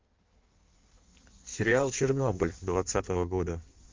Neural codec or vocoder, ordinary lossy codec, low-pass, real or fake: codec, 16 kHz in and 24 kHz out, 1.1 kbps, FireRedTTS-2 codec; Opus, 32 kbps; 7.2 kHz; fake